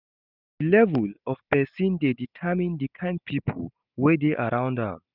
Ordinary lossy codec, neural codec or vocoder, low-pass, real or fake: none; none; 5.4 kHz; real